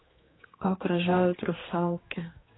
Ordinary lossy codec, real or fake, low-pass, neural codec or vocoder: AAC, 16 kbps; fake; 7.2 kHz; codec, 16 kHz, 2 kbps, X-Codec, HuBERT features, trained on general audio